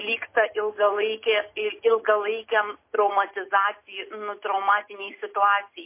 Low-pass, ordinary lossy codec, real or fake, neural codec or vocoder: 3.6 kHz; MP3, 24 kbps; real; none